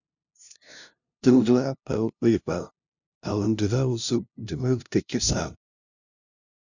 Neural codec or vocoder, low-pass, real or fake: codec, 16 kHz, 0.5 kbps, FunCodec, trained on LibriTTS, 25 frames a second; 7.2 kHz; fake